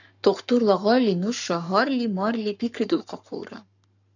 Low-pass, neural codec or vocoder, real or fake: 7.2 kHz; codec, 44.1 kHz, 7.8 kbps, Pupu-Codec; fake